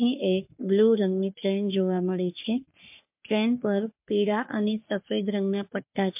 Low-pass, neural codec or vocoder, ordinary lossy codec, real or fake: 3.6 kHz; codec, 44.1 kHz, 3.4 kbps, Pupu-Codec; MP3, 32 kbps; fake